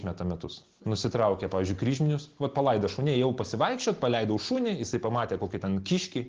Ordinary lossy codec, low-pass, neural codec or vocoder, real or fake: Opus, 16 kbps; 7.2 kHz; none; real